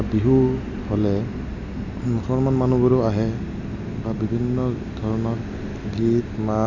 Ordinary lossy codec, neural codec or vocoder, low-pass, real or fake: none; none; 7.2 kHz; real